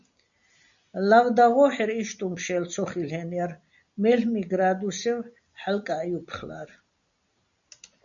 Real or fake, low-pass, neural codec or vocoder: real; 7.2 kHz; none